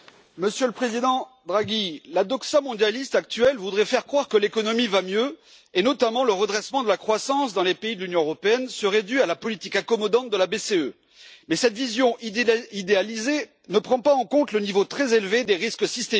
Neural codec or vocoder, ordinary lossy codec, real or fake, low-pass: none; none; real; none